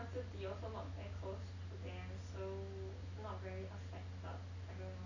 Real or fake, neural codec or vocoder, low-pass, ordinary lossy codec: real; none; 7.2 kHz; none